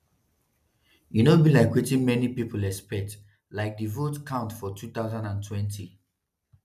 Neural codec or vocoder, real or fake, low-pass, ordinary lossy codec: none; real; 14.4 kHz; none